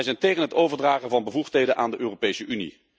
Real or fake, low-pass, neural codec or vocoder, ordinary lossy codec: real; none; none; none